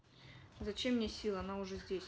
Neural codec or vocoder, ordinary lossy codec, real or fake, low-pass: none; none; real; none